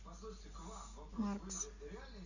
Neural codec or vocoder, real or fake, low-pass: none; real; 7.2 kHz